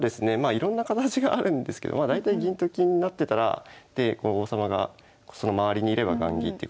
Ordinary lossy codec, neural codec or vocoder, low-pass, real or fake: none; none; none; real